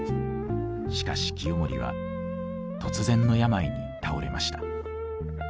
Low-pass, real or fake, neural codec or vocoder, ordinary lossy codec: none; real; none; none